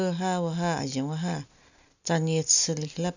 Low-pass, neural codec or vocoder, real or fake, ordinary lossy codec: 7.2 kHz; none; real; none